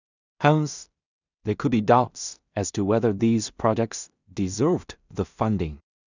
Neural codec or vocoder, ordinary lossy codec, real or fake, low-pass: codec, 16 kHz in and 24 kHz out, 0.4 kbps, LongCat-Audio-Codec, two codebook decoder; none; fake; 7.2 kHz